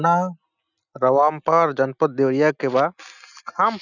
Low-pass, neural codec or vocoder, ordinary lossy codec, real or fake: 7.2 kHz; none; none; real